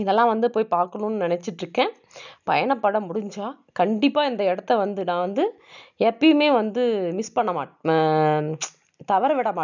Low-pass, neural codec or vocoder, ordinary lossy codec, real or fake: 7.2 kHz; none; none; real